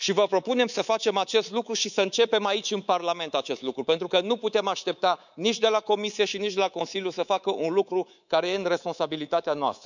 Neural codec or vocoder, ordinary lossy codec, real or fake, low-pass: codec, 24 kHz, 3.1 kbps, DualCodec; none; fake; 7.2 kHz